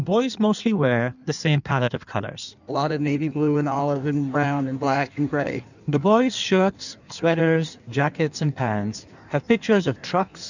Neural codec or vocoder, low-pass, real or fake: codec, 16 kHz in and 24 kHz out, 1.1 kbps, FireRedTTS-2 codec; 7.2 kHz; fake